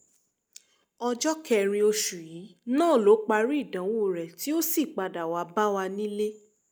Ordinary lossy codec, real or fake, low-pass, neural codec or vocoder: none; real; none; none